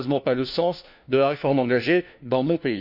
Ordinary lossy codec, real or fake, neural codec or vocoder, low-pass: none; fake; codec, 16 kHz, 1 kbps, FunCodec, trained on LibriTTS, 50 frames a second; 5.4 kHz